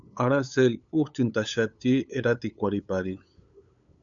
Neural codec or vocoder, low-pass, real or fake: codec, 16 kHz, 8 kbps, FunCodec, trained on LibriTTS, 25 frames a second; 7.2 kHz; fake